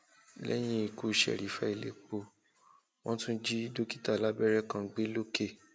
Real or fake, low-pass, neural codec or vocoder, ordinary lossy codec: real; none; none; none